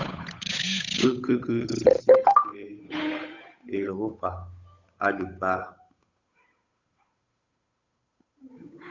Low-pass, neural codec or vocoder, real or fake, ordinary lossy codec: 7.2 kHz; codec, 16 kHz, 8 kbps, FunCodec, trained on Chinese and English, 25 frames a second; fake; Opus, 64 kbps